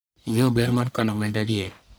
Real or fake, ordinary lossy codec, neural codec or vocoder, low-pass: fake; none; codec, 44.1 kHz, 1.7 kbps, Pupu-Codec; none